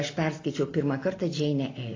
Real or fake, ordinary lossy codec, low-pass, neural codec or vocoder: real; AAC, 32 kbps; 7.2 kHz; none